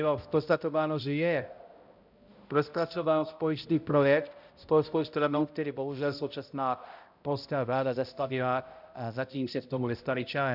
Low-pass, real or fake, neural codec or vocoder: 5.4 kHz; fake; codec, 16 kHz, 0.5 kbps, X-Codec, HuBERT features, trained on balanced general audio